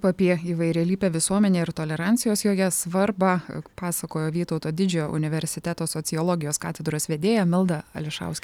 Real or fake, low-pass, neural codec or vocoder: real; 19.8 kHz; none